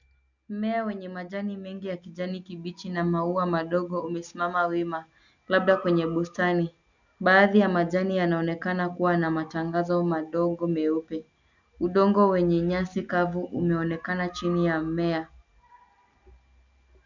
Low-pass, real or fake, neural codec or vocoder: 7.2 kHz; real; none